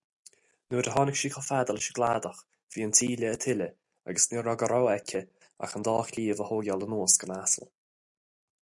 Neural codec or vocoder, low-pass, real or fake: none; 10.8 kHz; real